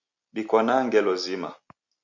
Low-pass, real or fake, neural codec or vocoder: 7.2 kHz; real; none